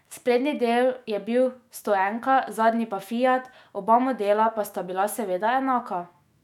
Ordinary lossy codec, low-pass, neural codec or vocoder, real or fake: none; 19.8 kHz; autoencoder, 48 kHz, 128 numbers a frame, DAC-VAE, trained on Japanese speech; fake